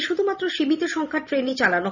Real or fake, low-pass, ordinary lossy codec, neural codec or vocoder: real; 7.2 kHz; none; none